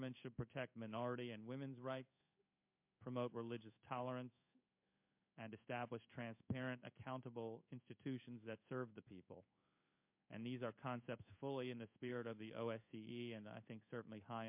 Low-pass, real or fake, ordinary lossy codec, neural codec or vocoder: 3.6 kHz; fake; MP3, 32 kbps; codec, 16 kHz in and 24 kHz out, 1 kbps, XY-Tokenizer